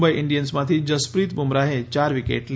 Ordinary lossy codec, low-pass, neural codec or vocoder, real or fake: none; none; none; real